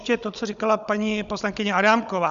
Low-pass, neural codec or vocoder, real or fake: 7.2 kHz; codec, 16 kHz, 16 kbps, FunCodec, trained on Chinese and English, 50 frames a second; fake